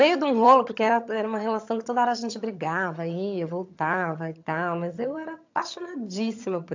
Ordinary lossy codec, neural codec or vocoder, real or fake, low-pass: AAC, 48 kbps; vocoder, 22.05 kHz, 80 mel bands, HiFi-GAN; fake; 7.2 kHz